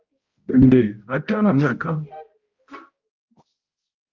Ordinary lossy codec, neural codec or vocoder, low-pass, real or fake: Opus, 32 kbps; codec, 16 kHz, 0.5 kbps, X-Codec, HuBERT features, trained on balanced general audio; 7.2 kHz; fake